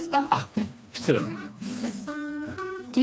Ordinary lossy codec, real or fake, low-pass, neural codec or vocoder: none; fake; none; codec, 16 kHz, 2 kbps, FreqCodec, smaller model